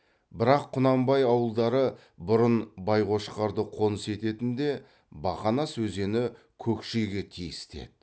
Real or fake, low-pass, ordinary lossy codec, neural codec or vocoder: real; none; none; none